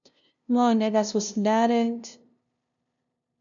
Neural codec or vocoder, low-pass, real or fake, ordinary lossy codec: codec, 16 kHz, 0.5 kbps, FunCodec, trained on LibriTTS, 25 frames a second; 7.2 kHz; fake; none